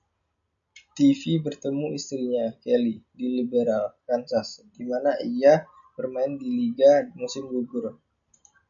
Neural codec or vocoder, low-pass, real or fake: none; 7.2 kHz; real